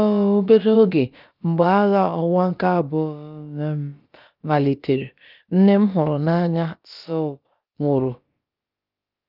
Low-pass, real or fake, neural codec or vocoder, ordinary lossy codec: 5.4 kHz; fake; codec, 16 kHz, about 1 kbps, DyCAST, with the encoder's durations; Opus, 24 kbps